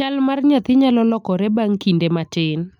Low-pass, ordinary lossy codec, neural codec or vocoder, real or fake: 19.8 kHz; none; none; real